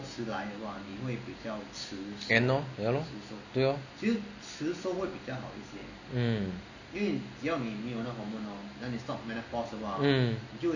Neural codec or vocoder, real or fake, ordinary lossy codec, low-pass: none; real; none; 7.2 kHz